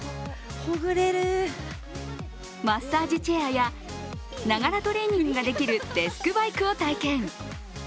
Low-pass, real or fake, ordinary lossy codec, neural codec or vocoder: none; real; none; none